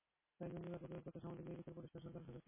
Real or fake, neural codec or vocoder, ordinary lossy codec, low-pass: real; none; MP3, 16 kbps; 3.6 kHz